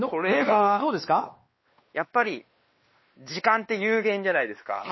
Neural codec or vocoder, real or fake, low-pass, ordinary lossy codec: codec, 16 kHz, 2 kbps, X-Codec, WavLM features, trained on Multilingual LibriSpeech; fake; 7.2 kHz; MP3, 24 kbps